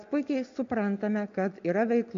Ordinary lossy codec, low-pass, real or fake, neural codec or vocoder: MP3, 48 kbps; 7.2 kHz; real; none